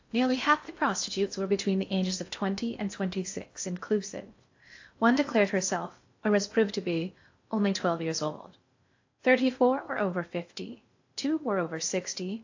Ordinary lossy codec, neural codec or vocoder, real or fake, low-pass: AAC, 48 kbps; codec, 16 kHz in and 24 kHz out, 0.6 kbps, FocalCodec, streaming, 4096 codes; fake; 7.2 kHz